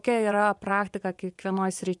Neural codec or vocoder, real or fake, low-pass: vocoder, 24 kHz, 100 mel bands, Vocos; fake; 10.8 kHz